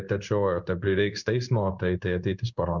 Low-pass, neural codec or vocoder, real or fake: 7.2 kHz; codec, 16 kHz in and 24 kHz out, 1 kbps, XY-Tokenizer; fake